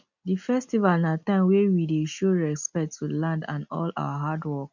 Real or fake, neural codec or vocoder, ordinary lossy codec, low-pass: real; none; none; 7.2 kHz